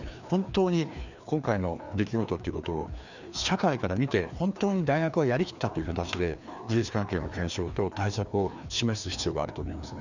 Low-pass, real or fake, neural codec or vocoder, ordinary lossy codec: 7.2 kHz; fake; codec, 16 kHz, 2 kbps, FreqCodec, larger model; none